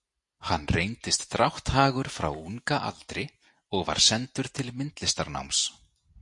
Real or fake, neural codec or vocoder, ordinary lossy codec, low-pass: real; none; MP3, 48 kbps; 10.8 kHz